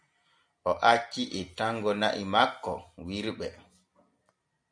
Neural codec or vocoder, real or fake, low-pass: none; real; 9.9 kHz